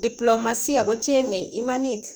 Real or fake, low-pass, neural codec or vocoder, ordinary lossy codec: fake; none; codec, 44.1 kHz, 2.6 kbps, DAC; none